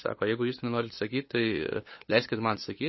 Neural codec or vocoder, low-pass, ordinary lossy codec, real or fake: codec, 16 kHz, 8 kbps, FunCodec, trained on LibriTTS, 25 frames a second; 7.2 kHz; MP3, 24 kbps; fake